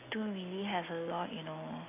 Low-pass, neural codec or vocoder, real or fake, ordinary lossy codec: 3.6 kHz; none; real; AAC, 24 kbps